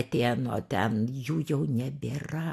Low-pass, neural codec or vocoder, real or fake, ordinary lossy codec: 14.4 kHz; none; real; AAC, 64 kbps